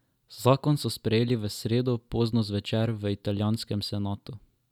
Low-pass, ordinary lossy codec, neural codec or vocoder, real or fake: 19.8 kHz; none; none; real